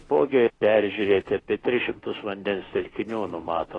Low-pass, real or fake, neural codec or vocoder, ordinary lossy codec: 10.8 kHz; fake; autoencoder, 48 kHz, 32 numbers a frame, DAC-VAE, trained on Japanese speech; AAC, 32 kbps